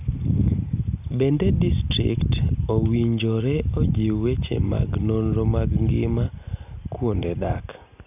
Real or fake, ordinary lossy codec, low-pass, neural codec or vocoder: real; none; 3.6 kHz; none